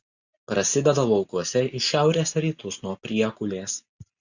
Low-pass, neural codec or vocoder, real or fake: 7.2 kHz; none; real